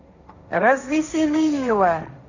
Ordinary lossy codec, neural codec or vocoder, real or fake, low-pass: none; codec, 16 kHz, 1.1 kbps, Voila-Tokenizer; fake; 7.2 kHz